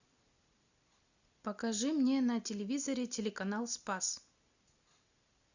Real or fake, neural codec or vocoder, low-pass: real; none; 7.2 kHz